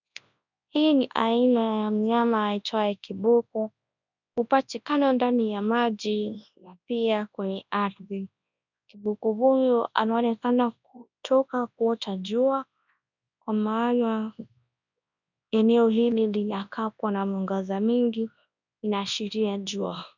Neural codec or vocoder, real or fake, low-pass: codec, 24 kHz, 0.9 kbps, WavTokenizer, large speech release; fake; 7.2 kHz